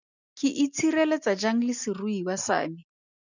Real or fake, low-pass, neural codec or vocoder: real; 7.2 kHz; none